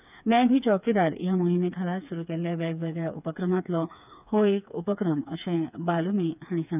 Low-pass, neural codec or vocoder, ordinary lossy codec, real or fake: 3.6 kHz; codec, 16 kHz, 4 kbps, FreqCodec, smaller model; none; fake